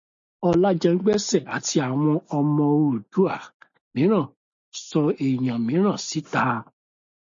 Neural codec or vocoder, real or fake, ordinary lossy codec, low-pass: none; real; MP3, 48 kbps; 7.2 kHz